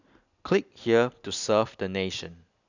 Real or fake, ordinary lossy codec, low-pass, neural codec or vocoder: real; none; 7.2 kHz; none